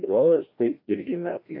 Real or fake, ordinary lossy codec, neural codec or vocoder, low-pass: fake; AAC, 32 kbps; codec, 16 kHz, 1 kbps, FreqCodec, larger model; 5.4 kHz